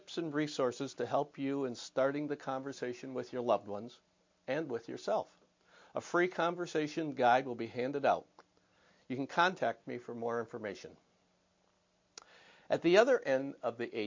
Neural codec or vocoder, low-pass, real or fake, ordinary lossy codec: none; 7.2 kHz; real; MP3, 48 kbps